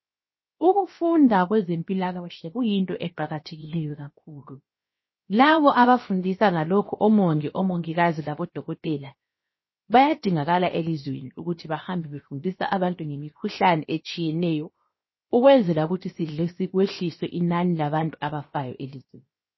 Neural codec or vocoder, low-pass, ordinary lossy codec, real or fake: codec, 16 kHz, 0.7 kbps, FocalCodec; 7.2 kHz; MP3, 24 kbps; fake